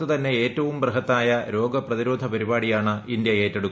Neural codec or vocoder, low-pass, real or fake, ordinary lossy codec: none; none; real; none